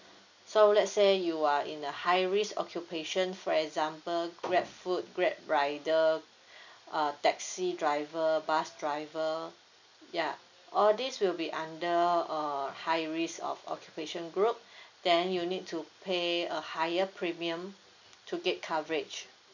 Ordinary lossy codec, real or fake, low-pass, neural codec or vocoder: none; real; 7.2 kHz; none